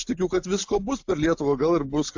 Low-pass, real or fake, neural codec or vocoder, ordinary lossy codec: 7.2 kHz; real; none; AAC, 48 kbps